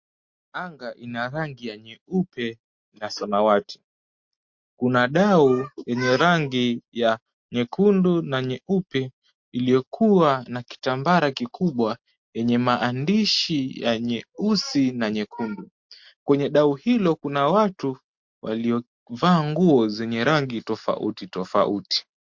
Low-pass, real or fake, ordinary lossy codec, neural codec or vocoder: 7.2 kHz; real; MP3, 48 kbps; none